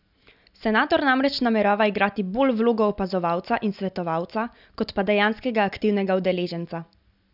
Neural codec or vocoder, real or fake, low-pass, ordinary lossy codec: none; real; 5.4 kHz; none